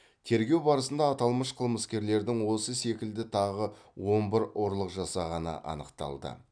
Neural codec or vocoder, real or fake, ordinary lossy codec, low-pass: none; real; none; 9.9 kHz